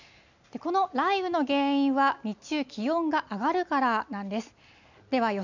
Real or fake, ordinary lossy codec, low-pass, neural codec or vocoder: real; none; 7.2 kHz; none